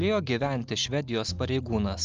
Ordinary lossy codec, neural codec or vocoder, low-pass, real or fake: Opus, 32 kbps; none; 7.2 kHz; real